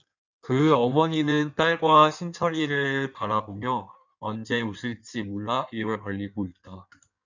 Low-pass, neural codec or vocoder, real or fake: 7.2 kHz; codec, 16 kHz in and 24 kHz out, 1.1 kbps, FireRedTTS-2 codec; fake